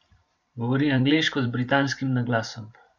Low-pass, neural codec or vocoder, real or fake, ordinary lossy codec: 7.2 kHz; vocoder, 44.1 kHz, 128 mel bands every 512 samples, BigVGAN v2; fake; MP3, 64 kbps